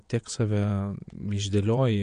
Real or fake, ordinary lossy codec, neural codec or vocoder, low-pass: fake; MP3, 64 kbps; vocoder, 22.05 kHz, 80 mel bands, WaveNeXt; 9.9 kHz